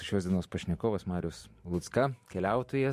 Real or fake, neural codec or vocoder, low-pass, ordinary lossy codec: fake; vocoder, 44.1 kHz, 128 mel bands every 512 samples, BigVGAN v2; 14.4 kHz; MP3, 64 kbps